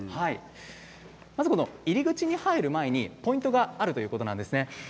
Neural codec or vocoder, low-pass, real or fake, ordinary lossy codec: none; none; real; none